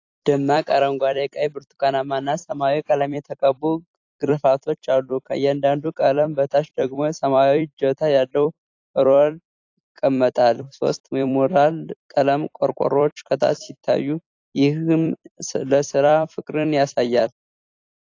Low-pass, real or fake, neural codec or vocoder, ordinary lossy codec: 7.2 kHz; fake; vocoder, 44.1 kHz, 128 mel bands every 256 samples, BigVGAN v2; AAC, 48 kbps